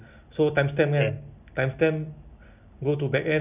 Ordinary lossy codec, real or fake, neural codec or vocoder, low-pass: none; real; none; 3.6 kHz